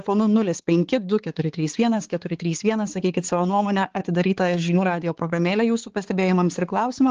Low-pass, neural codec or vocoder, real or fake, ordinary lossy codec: 7.2 kHz; codec, 16 kHz, 4 kbps, X-Codec, HuBERT features, trained on balanced general audio; fake; Opus, 16 kbps